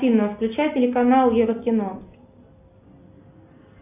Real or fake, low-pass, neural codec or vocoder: real; 3.6 kHz; none